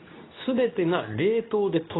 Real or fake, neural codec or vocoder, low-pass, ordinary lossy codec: fake; codec, 24 kHz, 0.9 kbps, WavTokenizer, medium speech release version 2; 7.2 kHz; AAC, 16 kbps